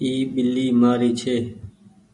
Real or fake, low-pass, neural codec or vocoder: real; 10.8 kHz; none